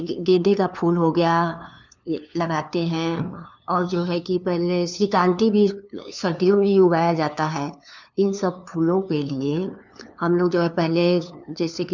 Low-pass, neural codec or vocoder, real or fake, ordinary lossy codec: 7.2 kHz; codec, 16 kHz, 2 kbps, FunCodec, trained on LibriTTS, 25 frames a second; fake; none